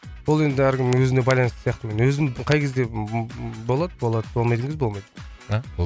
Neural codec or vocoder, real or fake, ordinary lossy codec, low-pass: none; real; none; none